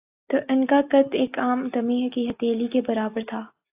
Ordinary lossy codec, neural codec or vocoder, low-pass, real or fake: AAC, 32 kbps; none; 3.6 kHz; real